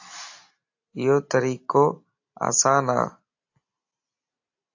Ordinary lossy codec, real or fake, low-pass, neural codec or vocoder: AAC, 48 kbps; real; 7.2 kHz; none